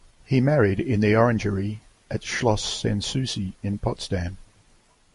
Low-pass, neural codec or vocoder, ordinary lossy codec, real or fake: 14.4 kHz; none; MP3, 48 kbps; real